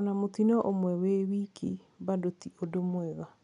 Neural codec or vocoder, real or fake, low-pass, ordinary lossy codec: none; real; 10.8 kHz; none